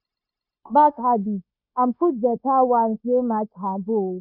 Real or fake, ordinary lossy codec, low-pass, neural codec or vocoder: fake; none; 5.4 kHz; codec, 16 kHz, 0.9 kbps, LongCat-Audio-Codec